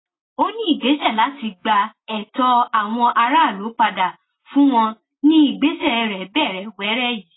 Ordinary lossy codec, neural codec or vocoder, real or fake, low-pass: AAC, 16 kbps; none; real; 7.2 kHz